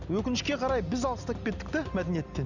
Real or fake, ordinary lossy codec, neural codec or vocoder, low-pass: real; none; none; 7.2 kHz